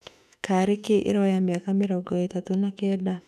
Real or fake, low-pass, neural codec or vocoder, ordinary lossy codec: fake; 14.4 kHz; autoencoder, 48 kHz, 32 numbers a frame, DAC-VAE, trained on Japanese speech; none